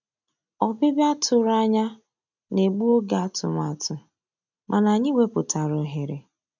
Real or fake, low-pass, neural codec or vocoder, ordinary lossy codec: real; 7.2 kHz; none; none